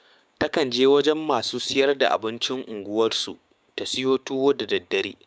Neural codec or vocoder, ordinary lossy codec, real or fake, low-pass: codec, 16 kHz, 6 kbps, DAC; none; fake; none